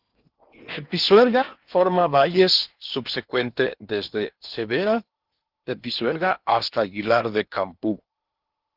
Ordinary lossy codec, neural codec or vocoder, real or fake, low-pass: Opus, 32 kbps; codec, 16 kHz in and 24 kHz out, 0.8 kbps, FocalCodec, streaming, 65536 codes; fake; 5.4 kHz